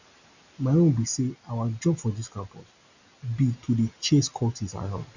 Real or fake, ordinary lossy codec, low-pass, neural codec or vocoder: real; none; 7.2 kHz; none